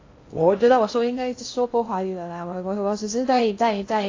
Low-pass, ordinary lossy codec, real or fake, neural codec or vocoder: 7.2 kHz; AAC, 32 kbps; fake; codec, 16 kHz in and 24 kHz out, 0.6 kbps, FocalCodec, streaming, 2048 codes